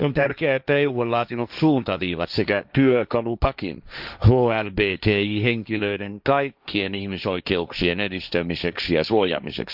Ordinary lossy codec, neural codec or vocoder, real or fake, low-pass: none; codec, 16 kHz, 1.1 kbps, Voila-Tokenizer; fake; 5.4 kHz